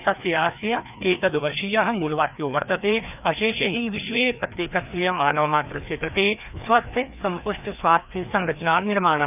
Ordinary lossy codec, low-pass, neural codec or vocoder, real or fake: none; 3.6 kHz; codec, 16 kHz, 2 kbps, FreqCodec, larger model; fake